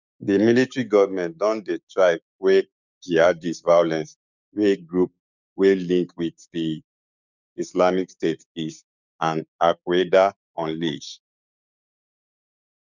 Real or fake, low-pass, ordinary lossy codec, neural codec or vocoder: fake; 7.2 kHz; none; codec, 16 kHz, 6 kbps, DAC